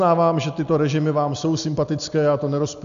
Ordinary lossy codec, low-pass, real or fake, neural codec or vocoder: AAC, 96 kbps; 7.2 kHz; real; none